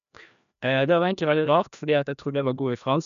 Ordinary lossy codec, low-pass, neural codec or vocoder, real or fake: none; 7.2 kHz; codec, 16 kHz, 1 kbps, FreqCodec, larger model; fake